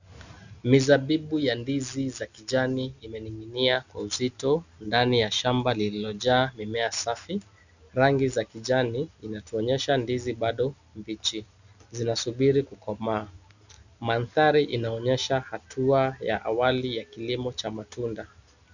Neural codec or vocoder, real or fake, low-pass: none; real; 7.2 kHz